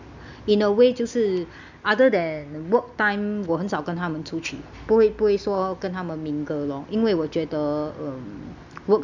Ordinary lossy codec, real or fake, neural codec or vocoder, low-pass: none; real; none; 7.2 kHz